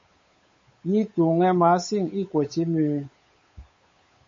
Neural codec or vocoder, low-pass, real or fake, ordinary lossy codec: codec, 16 kHz, 8 kbps, FunCodec, trained on Chinese and English, 25 frames a second; 7.2 kHz; fake; MP3, 32 kbps